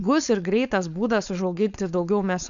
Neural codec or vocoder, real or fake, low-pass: codec, 16 kHz, 4.8 kbps, FACodec; fake; 7.2 kHz